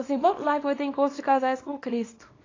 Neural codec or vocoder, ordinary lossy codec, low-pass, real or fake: codec, 24 kHz, 0.9 kbps, WavTokenizer, small release; AAC, 32 kbps; 7.2 kHz; fake